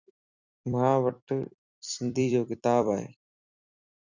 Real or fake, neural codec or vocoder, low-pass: fake; vocoder, 44.1 kHz, 128 mel bands every 256 samples, BigVGAN v2; 7.2 kHz